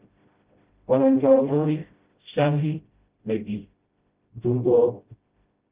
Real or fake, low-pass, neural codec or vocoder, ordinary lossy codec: fake; 3.6 kHz; codec, 16 kHz, 0.5 kbps, FreqCodec, smaller model; Opus, 32 kbps